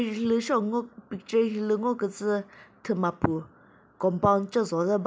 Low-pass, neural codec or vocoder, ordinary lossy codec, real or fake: none; none; none; real